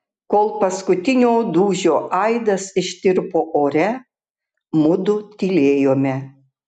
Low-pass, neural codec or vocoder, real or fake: 9.9 kHz; none; real